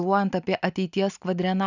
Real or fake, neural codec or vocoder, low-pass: real; none; 7.2 kHz